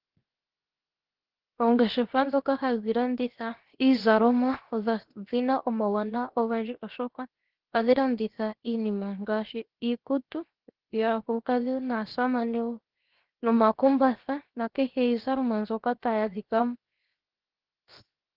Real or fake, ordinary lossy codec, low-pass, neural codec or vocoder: fake; Opus, 16 kbps; 5.4 kHz; codec, 16 kHz, 0.7 kbps, FocalCodec